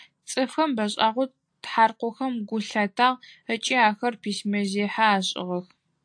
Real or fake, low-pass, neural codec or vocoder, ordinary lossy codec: real; 9.9 kHz; none; AAC, 64 kbps